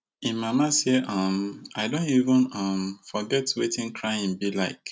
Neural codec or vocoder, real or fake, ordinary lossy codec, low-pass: none; real; none; none